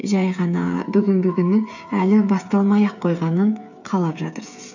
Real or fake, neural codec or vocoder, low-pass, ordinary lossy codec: fake; codec, 16 kHz, 8 kbps, FreqCodec, smaller model; 7.2 kHz; none